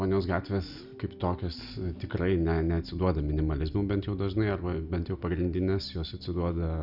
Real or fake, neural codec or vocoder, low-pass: real; none; 5.4 kHz